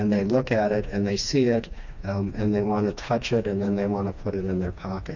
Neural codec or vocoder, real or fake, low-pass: codec, 16 kHz, 2 kbps, FreqCodec, smaller model; fake; 7.2 kHz